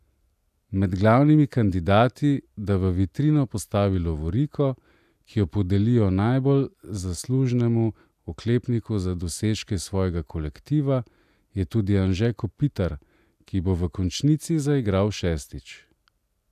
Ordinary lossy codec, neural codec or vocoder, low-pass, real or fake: none; none; 14.4 kHz; real